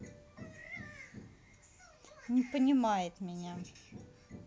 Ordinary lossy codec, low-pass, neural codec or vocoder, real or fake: none; none; none; real